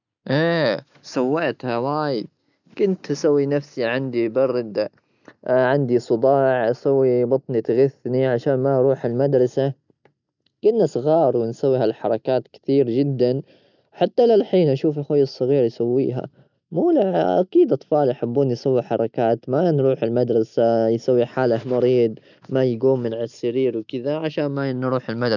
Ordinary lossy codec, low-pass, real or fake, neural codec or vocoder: none; 7.2 kHz; real; none